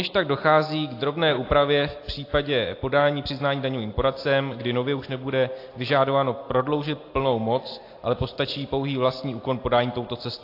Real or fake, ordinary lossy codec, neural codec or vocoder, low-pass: real; AAC, 32 kbps; none; 5.4 kHz